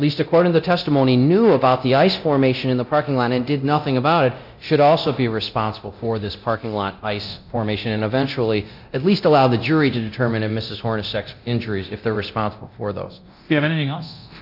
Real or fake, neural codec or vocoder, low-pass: fake; codec, 24 kHz, 0.9 kbps, DualCodec; 5.4 kHz